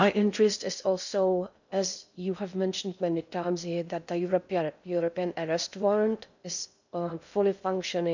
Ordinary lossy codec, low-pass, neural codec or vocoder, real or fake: none; 7.2 kHz; codec, 16 kHz in and 24 kHz out, 0.6 kbps, FocalCodec, streaming, 2048 codes; fake